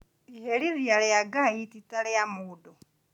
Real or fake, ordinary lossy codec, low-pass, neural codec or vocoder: real; none; 19.8 kHz; none